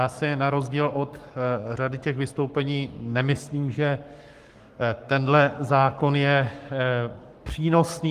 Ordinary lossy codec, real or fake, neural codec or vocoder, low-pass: Opus, 24 kbps; fake; codec, 44.1 kHz, 7.8 kbps, Pupu-Codec; 14.4 kHz